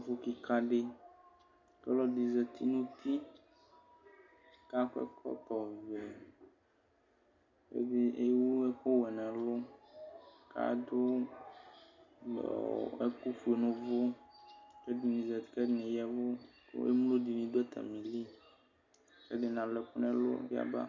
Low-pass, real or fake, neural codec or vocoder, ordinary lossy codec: 7.2 kHz; real; none; MP3, 64 kbps